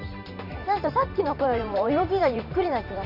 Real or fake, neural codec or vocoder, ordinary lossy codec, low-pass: fake; vocoder, 44.1 kHz, 128 mel bands every 512 samples, BigVGAN v2; none; 5.4 kHz